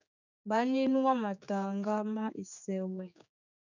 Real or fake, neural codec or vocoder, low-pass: fake; codec, 16 kHz, 4 kbps, X-Codec, HuBERT features, trained on general audio; 7.2 kHz